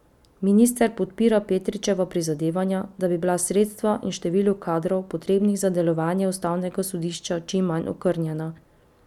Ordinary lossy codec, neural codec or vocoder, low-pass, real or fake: none; none; 19.8 kHz; real